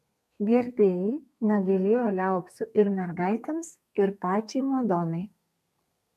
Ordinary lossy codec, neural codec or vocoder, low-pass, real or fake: MP3, 96 kbps; codec, 32 kHz, 1.9 kbps, SNAC; 14.4 kHz; fake